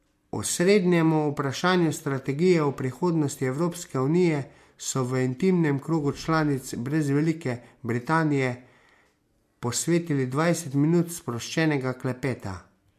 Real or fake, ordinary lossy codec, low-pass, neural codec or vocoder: real; MP3, 64 kbps; 14.4 kHz; none